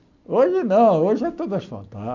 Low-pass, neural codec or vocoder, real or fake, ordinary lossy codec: 7.2 kHz; none; real; none